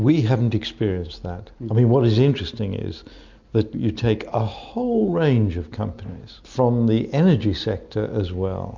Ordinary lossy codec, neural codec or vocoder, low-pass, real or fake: MP3, 64 kbps; none; 7.2 kHz; real